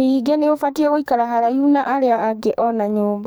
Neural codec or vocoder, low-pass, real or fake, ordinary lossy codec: codec, 44.1 kHz, 2.6 kbps, SNAC; none; fake; none